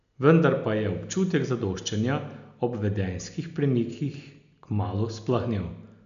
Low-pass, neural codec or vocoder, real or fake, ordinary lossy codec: 7.2 kHz; none; real; none